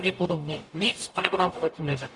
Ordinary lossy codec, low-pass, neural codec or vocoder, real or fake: Opus, 64 kbps; 10.8 kHz; codec, 44.1 kHz, 0.9 kbps, DAC; fake